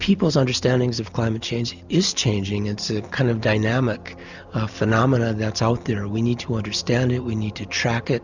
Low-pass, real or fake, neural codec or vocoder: 7.2 kHz; real; none